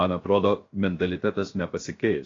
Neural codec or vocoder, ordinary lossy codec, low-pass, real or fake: codec, 16 kHz, 0.7 kbps, FocalCodec; AAC, 32 kbps; 7.2 kHz; fake